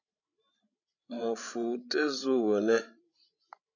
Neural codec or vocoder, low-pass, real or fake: codec, 16 kHz, 16 kbps, FreqCodec, larger model; 7.2 kHz; fake